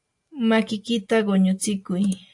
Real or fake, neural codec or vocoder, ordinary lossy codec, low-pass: real; none; AAC, 64 kbps; 10.8 kHz